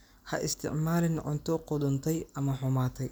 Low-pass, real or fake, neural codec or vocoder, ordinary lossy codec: none; real; none; none